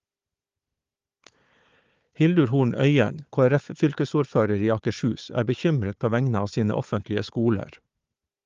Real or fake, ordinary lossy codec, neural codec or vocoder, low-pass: fake; Opus, 32 kbps; codec, 16 kHz, 4 kbps, FunCodec, trained on Chinese and English, 50 frames a second; 7.2 kHz